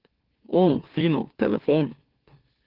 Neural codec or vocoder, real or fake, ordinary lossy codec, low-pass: autoencoder, 44.1 kHz, a latent of 192 numbers a frame, MeloTTS; fake; Opus, 16 kbps; 5.4 kHz